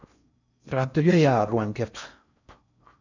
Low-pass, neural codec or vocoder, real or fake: 7.2 kHz; codec, 16 kHz in and 24 kHz out, 0.6 kbps, FocalCodec, streaming, 4096 codes; fake